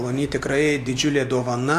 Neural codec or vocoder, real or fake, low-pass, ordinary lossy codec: none; real; 14.4 kHz; AAC, 64 kbps